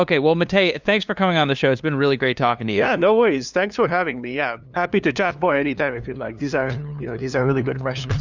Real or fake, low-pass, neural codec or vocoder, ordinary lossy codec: fake; 7.2 kHz; codec, 16 kHz, 2 kbps, FunCodec, trained on LibriTTS, 25 frames a second; Opus, 64 kbps